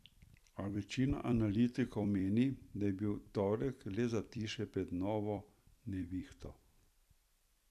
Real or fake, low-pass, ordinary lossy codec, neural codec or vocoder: fake; 14.4 kHz; none; vocoder, 44.1 kHz, 128 mel bands every 256 samples, BigVGAN v2